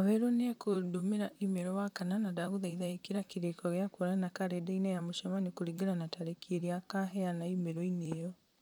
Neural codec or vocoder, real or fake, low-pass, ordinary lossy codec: vocoder, 44.1 kHz, 128 mel bands, Pupu-Vocoder; fake; none; none